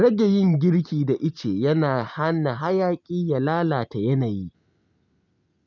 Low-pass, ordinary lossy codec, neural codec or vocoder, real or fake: 7.2 kHz; none; none; real